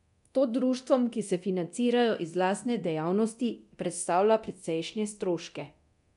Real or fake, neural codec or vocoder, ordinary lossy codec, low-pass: fake; codec, 24 kHz, 0.9 kbps, DualCodec; none; 10.8 kHz